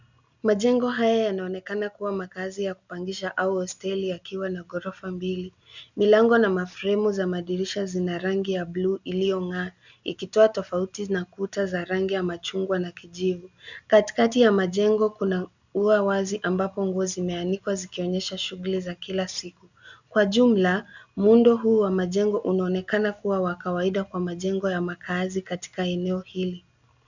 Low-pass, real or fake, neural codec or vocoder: 7.2 kHz; real; none